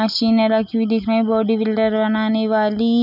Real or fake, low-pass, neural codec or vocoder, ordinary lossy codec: real; 5.4 kHz; none; none